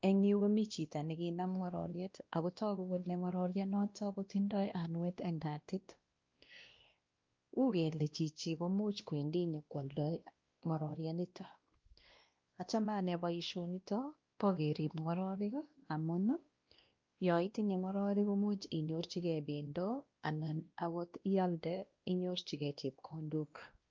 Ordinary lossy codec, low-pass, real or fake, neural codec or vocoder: Opus, 24 kbps; 7.2 kHz; fake; codec, 16 kHz, 1 kbps, X-Codec, WavLM features, trained on Multilingual LibriSpeech